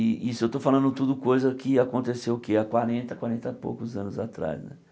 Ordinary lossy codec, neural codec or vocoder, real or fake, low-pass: none; none; real; none